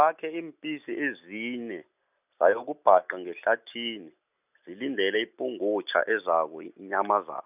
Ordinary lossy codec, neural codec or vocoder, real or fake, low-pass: none; none; real; 3.6 kHz